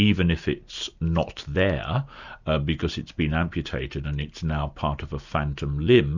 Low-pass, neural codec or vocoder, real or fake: 7.2 kHz; none; real